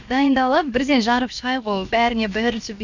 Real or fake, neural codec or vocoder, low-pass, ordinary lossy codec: fake; codec, 16 kHz, about 1 kbps, DyCAST, with the encoder's durations; 7.2 kHz; none